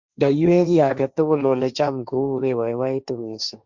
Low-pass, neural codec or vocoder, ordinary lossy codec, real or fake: 7.2 kHz; codec, 16 kHz, 1.1 kbps, Voila-Tokenizer; Opus, 64 kbps; fake